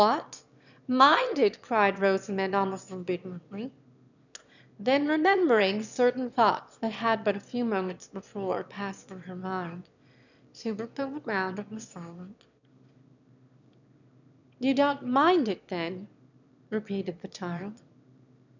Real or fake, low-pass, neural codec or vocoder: fake; 7.2 kHz; autoencoder, 22.05 kHz, a latent of 192 numbers a frame, VITS, trained on one speaker